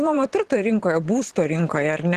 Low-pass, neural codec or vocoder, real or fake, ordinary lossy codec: 14.4 kHz; vocoder, 44.1 kHz, 128 mel bands every 512 samples, BigVGAN v2; fake; Opus, 16 kbps